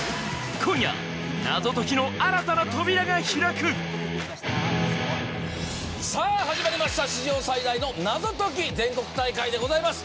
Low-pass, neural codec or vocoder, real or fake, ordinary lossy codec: none; none; real; none